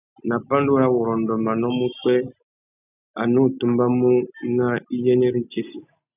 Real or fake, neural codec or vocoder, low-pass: real; none; 3.6 kHz